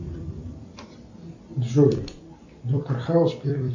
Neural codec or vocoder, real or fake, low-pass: vocoder, 44.1 kHz, 128 mel bands every 256 samples, BigVGAN v2; fake; 7.2 kHz